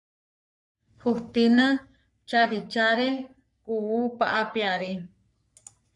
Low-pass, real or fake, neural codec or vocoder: 10.8 kHz; fake; codec, 44.1 kHz, 3.4 kbps, Pupu-Codec